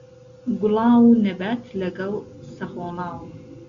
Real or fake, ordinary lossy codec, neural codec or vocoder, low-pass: real; Opus, 64 kbps; none; 7.2 kHz